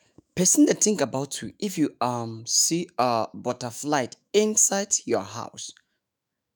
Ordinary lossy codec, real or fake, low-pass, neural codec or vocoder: none; fake; none; autoencoder, 48 kHz, 128 numbers a frame, DAC-VAE, trained on Japanese speech